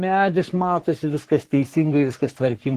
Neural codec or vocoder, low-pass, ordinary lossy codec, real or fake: codec, 44.1 kHz, 3.4 kbps, Pupu-Codec; 14.4 kHz; Opus, 16 kbps; fake